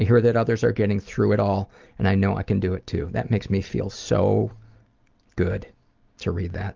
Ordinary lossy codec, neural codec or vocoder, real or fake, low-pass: Opus, 24 kbps; none; real; 7.2 kHz